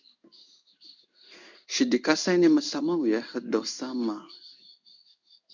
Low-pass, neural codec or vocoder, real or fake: 7.2 kHz; codec, 16 kHz in and 24 kHz out, 1 kbps, XY-Tokenizer; fake